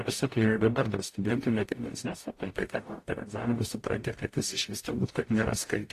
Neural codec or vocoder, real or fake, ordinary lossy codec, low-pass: codec, 44.1 kHz, 0.9 kbps, DAC; fake; AAC, 48 kbps; 14.4 kHz